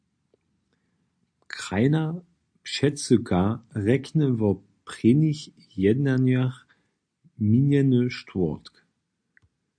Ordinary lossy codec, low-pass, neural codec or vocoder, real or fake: AAC, 64 kbps; 9.9 kHz; none; real